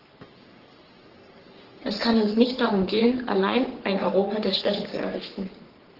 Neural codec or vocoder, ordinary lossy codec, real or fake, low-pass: codec, 44.1 kHz, 3.4 kbps, Pupu-Codec; Opus, 16 kbps; fake; 5.4 kHz